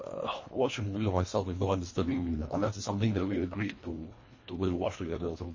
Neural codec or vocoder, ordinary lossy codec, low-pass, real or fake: codec, 24 kHz, 1.5 kbps, HILCodec; MP3, 32 kbps; 7.2 kHz; fake